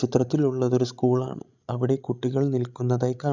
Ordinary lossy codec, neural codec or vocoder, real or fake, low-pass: none; codec, 16 kHz, 16 kbps, FreqCodec, larger model; fake; 7.2 kHz